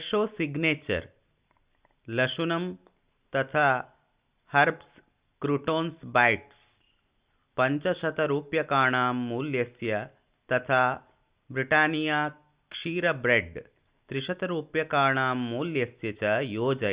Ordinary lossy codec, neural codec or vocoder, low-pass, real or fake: Opus, 64 kbps; none; 3.6 kHz; real